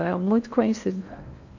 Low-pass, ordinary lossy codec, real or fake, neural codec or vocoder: 7.2 kHz; none; fake; codec, 16 kHz in and 24 kHz out, 0.8 kbps, FocalCodec, streaming, 65536 codes